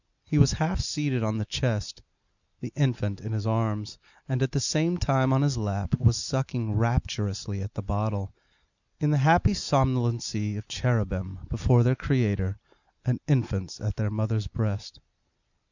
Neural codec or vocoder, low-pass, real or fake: none; 7.2 kHz; real